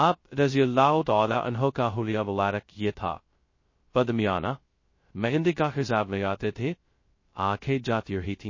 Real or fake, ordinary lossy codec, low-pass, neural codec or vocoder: fake; MP3, 32 kbps; 7.2 kHz; codec, 16 kHz, 0.2 kbps, FocalCodec